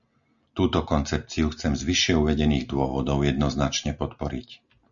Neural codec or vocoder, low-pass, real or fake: none; 7.2 kHz; real